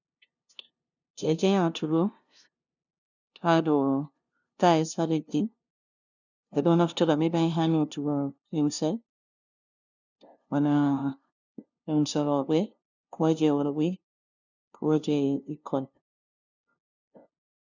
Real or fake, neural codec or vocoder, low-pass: fake; codec, 16 kHz, 0.5 kbps, FunCodec, trained on LibriTTS, 25 frames a second; 7.2 kHz